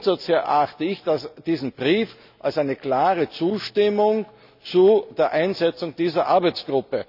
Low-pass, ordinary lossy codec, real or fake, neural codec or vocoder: 5.4 kHz; none; real; none